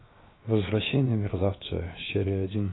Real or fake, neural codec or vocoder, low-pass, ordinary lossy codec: fake; codec, 16 kHz, 0.7 kbps, FocalCodec; 7.2 kHz; AAC, 16 kbps